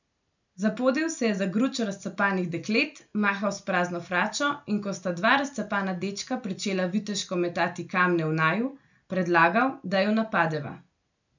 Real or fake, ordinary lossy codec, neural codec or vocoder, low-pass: real; none; none; 7.2 kHz